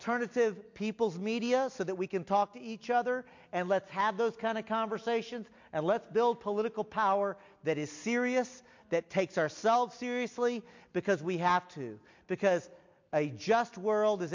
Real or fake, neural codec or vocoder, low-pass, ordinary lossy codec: real; none; 7.2 kHz; MP3, 48 kbps